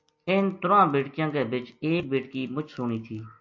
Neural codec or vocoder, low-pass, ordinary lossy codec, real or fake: none; 7.2 kHz; MP3, 48 kbps; real